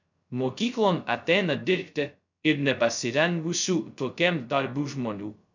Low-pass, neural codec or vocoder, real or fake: 7.2 kHz; codec, 16 kHz, 0.2 kbps, FocalCodec; fake